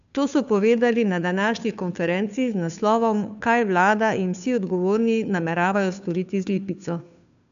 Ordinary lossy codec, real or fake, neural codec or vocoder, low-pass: none; fake; codec, 16 kHz, 2 kbps, FunCodec, trained on Chinese and English, 25 frames a second; 7.2 kHz